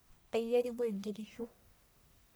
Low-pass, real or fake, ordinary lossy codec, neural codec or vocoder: none; fake; none; codec, 44.1 kHz, 1.7 kbps, Pupu-Codec